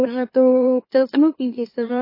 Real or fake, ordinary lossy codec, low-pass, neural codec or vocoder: fake; MP3, 24 kbps; 5.4 kHz; autoencoder, 44.1 kHz, a latent of 192 numbers a frame, MeloTTS